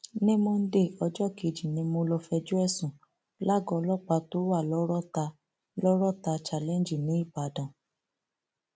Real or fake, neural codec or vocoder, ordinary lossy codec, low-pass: real; none; none; none